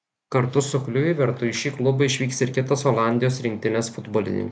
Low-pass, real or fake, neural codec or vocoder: 9.9 kHz; real; none